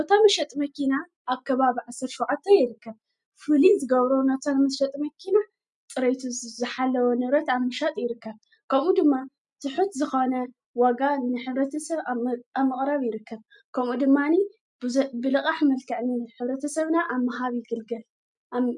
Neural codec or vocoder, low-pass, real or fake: none; 10.8 kHz; real